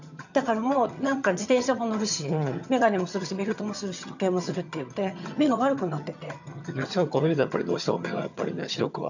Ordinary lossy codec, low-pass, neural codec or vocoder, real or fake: none; 7.2 kHz; vocoder, 22.05 kHz, 80 mel bands, HiFi-GAN; fake